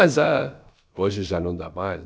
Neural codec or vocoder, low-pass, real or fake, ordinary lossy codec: codec, 16 kHz, about 1 kbps, DyCAST, with the encoder's durations; none; fake; none